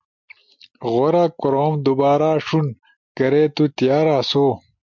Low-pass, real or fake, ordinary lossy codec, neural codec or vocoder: 7.2 kHz; real; MP3, 64 kbps; none